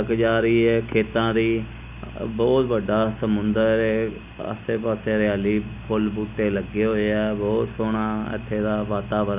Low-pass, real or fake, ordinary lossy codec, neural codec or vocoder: 3.6 kHz; real; none; none